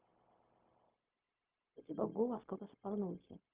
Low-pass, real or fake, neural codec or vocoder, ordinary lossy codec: 3.6 kHz; fake; codec, 16 kHz, 0.4 kbps, LongCat-Audio-Codec; Opus, 24 kbps